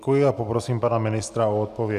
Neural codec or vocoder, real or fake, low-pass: none; real; 14.4 kHz